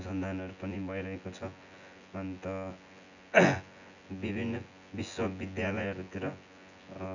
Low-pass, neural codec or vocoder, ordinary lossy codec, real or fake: 7.2 kHz; vocoder, 24 kHz, 100 mel bands, Vocos; none; fake